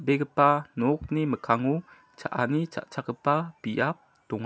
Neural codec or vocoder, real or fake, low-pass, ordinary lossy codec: none; real; none; none